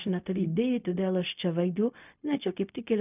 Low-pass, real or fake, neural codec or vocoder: 3.6 kHz; fake; codec, 16 kHz, 0.4 kbps, LongCat-Audio-Codec